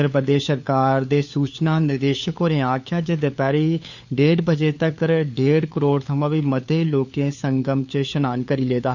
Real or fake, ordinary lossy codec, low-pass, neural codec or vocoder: fake; none; 7.2 kHz; codec, 16 kHz, 4 kbps, FunCodec, trained on LibriTTS, 50 frames a second